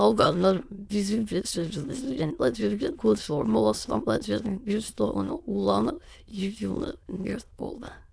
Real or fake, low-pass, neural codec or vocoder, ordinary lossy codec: fake; none; autoencoder, 22.05 kHz, a latent of 192 numbers a frame, VITS, trained on many speakers; none